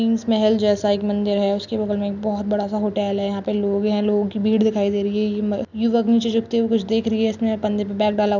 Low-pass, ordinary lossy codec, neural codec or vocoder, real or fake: 7.2 kHz; none; none; real